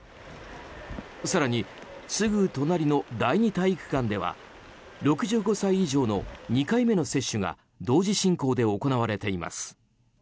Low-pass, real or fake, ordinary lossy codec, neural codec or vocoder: none; real; none; none